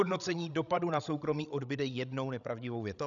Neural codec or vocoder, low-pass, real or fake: codec, 16 kHz, 16 kbps, FreqCodec, larger model; 7.2 kHz; fake